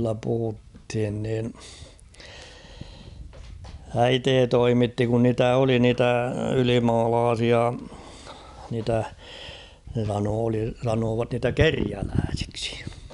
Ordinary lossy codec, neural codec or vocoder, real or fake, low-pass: none; none; real; 10.8 kHz